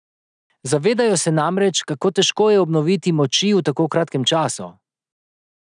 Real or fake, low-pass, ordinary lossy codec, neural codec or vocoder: real; 9.9 kHz; none; none